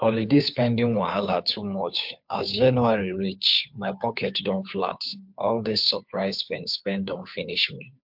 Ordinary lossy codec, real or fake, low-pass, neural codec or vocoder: MP3, 48 kbps; fake; 5.4 kHz; codec, 16 kHz, 2 kbps, FunCodec, trained on Chinese and English, 25 frames a second